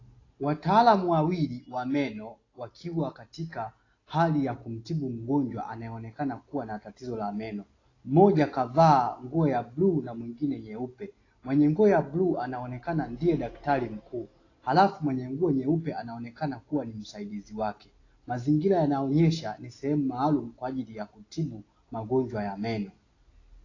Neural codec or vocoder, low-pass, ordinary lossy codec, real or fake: none; 7.2 kHz; AAC, 32 kbps; real